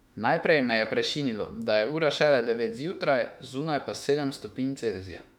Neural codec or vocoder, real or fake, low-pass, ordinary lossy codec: autoencoder, 48 kHz, 32 numbers a frame, DAC-VAE, trained on Japanese speech; fake; 19.8 kHz; none